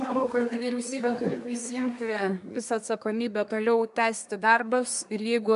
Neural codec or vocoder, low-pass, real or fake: codec, 24 kHz, 1 kbps, SNAC; 10.8 kHz; fake